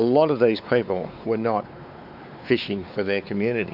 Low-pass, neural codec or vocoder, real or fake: 5.4 kHz; codec, 16 kHz, 4 kbps, X-Codec, WavLM features, trained on Multilingual LibriSpeech; fake